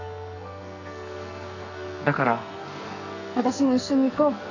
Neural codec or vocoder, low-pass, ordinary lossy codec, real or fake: codec, 44.1 kHz, 2.6 kbps, SNAC; 7.2 kHz; none; fake